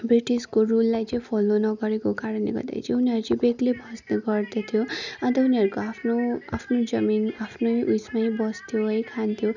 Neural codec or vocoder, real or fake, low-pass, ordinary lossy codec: none; real; 7.2 kHz; none